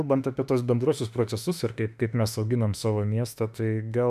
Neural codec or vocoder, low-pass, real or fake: autoencoder, 48 kHz, 32 numbers a frame, DAC-VAE, trained on Japanese speech; 14.4 kHz; fake